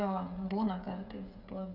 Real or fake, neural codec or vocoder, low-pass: fake; codec, 16 kHz, 16 kbps, FreqCodec, smaller model; 5.4 kHz